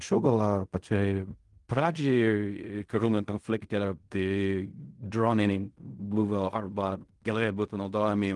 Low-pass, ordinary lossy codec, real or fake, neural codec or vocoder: 10.8 kHz; Opus, 24 kbps; fake; codec, 16 kHz in and 24 kHz out, 0.4 kbps, LongCat-Audio-Codec, fine tuned four codebook decoder